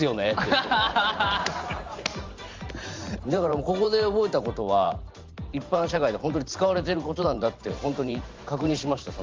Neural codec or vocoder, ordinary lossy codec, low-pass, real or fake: vocoder, 44.1 kHz, 128 mel bands every 512 samples, BigVGAN v2; Opus, 32 kbps; 7.2 kHz; fake